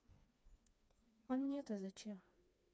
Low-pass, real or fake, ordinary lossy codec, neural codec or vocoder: none; fake; none; codec, 16 kHz, 2 kbps, FreqCodec, smaller model